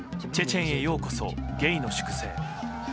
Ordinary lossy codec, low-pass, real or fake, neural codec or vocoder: none; none; real; none